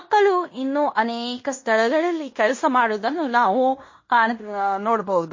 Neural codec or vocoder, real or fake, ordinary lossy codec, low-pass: codec, 16 kHz in and 24 kHz out, 0.9 kbps, LongCat-Audio-Codec, fine tuned four codebook decoder; fake; MP3, 32 kbps; 7.2 kHz